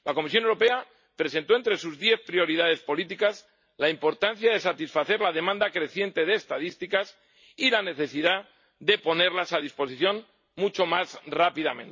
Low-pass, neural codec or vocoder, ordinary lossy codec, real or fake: 7.2 kHz; none; MP3, 32 kbps; real